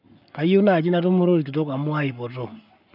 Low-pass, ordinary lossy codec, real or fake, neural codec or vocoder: 5.4 kHz; none; fake; codec, 44.1 kHz, 7.8 kbps, Pupu-Codec